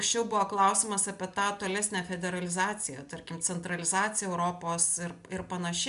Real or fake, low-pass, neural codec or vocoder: real; 10.8 kHz; none